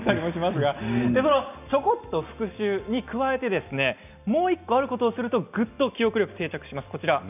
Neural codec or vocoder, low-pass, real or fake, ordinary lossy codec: none; 3.6 kHz; real; none